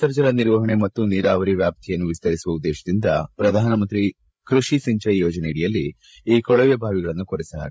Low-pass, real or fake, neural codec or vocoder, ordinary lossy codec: none; fake; codec, 16 kHz, 8 kbps, FreqCodec, larger model; none